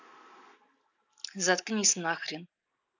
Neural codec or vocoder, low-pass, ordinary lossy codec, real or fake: none; 7.2 kHz; none; real